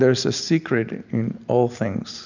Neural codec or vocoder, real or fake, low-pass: none; real; 7.2 kHz